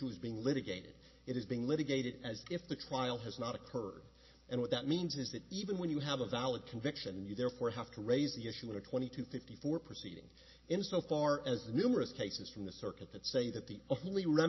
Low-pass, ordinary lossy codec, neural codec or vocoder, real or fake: 7.2 kHz; MP3, 24 kbps; none; real